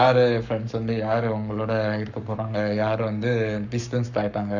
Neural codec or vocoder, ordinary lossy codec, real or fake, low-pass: codec, 44.1 kHz, 7.8 kbps, Pupu-Codec; none; fake; 7.2 kHz